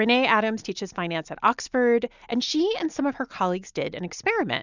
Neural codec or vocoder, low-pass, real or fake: none; 7.2 kHz; real